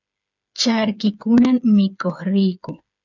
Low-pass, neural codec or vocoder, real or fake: 7.2 kHz; codec, 16 kHz, 8 kbps, FreqCodec, smaller model; fake